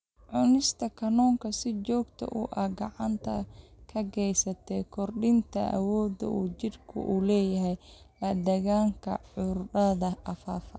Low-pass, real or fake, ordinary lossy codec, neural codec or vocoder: none; real; none; none